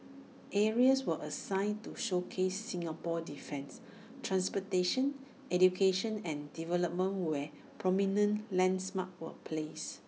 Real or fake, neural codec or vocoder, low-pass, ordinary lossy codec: real; none; none; none